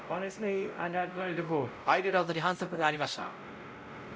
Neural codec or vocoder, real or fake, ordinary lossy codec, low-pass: codec, 16 kHz, 0.5 kbps, X-Codec, WavLM features, trained on Multilingual LibriSpeech; fake; none; none